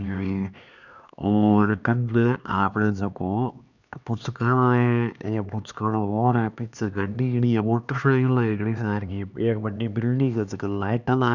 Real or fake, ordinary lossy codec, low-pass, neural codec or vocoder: fake; none; 7.2 kHz; codec, 16 kHz, 2 kbps, X-Codec, HuBERT features, trained on LibriSpeech